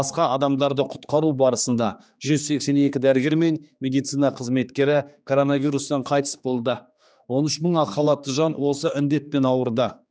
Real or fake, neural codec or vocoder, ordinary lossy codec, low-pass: fake; codec, 16 kHz, 2 kbps, X-Codec, HuBERT features, trained on general audio; none; none